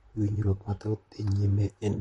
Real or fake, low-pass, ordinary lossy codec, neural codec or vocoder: fake; 19.8 kHz; MP3, 48 kbps; vocoder, 44.1 kHz, 128 mel bands, Pupu-Vocoder